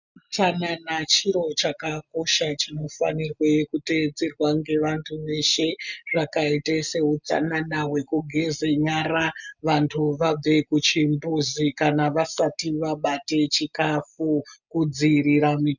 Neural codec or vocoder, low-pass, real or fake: none; 7.2 kHz; real